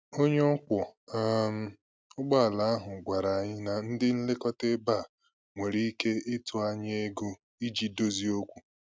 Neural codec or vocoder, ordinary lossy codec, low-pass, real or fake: none; none; none; real